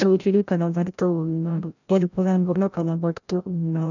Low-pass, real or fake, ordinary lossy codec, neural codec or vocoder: 7.2 kHz; fake; none; codec, 16 kHz, 0.5 kbps, FreqCodec, larger model